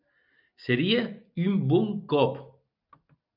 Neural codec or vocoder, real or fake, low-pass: none; real; 5.4 kHz